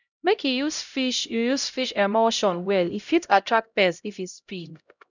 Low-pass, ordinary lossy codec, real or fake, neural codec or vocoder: 7.2 kHz; none; fake; codec, 16 kHz, 0.5 kbps, X-Codec, HuBERT features, trained on LibriSpeech